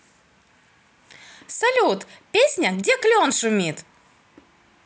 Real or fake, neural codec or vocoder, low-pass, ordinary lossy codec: real; none; none; none